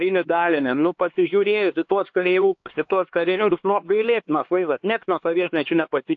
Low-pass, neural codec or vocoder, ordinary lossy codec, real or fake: 7.2 kHz; codec, 16 kHz, 2 kbps, X-Codec, HuBERT features, trained on LibriSpeech; AAC, 48 kbps; fake